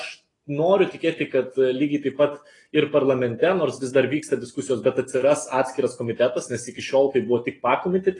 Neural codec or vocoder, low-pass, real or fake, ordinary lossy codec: none; 10.8 kHz; real; AAC, 32 kbps